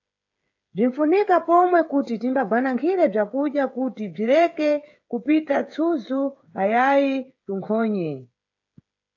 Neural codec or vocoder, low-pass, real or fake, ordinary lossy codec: codec, 16 kHz, 8 kbps, FreqCodec, smaller model; 7.2 kHz; fake; AAC, 48 kbps